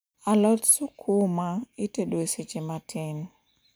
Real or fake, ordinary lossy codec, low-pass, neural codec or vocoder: real; none; none; none